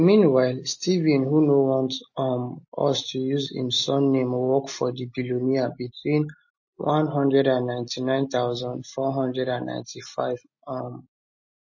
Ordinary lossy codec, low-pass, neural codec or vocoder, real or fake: MP3, 32 kbps; 7.2 kHz; none; real